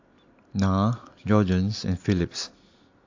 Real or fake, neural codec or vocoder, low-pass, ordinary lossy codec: real; none; 7.2 kHz; AAC, 48 kbps